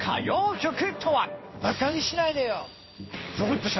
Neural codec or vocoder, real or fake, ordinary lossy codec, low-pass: codec, 16 kHz in and 24 kHz out, 1 kbps, XY-Tokenizer; fake; MP3, 24 kbps; 7.2 kHz